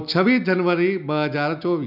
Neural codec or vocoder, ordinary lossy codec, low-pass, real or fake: none; none; 5.4 kHz; real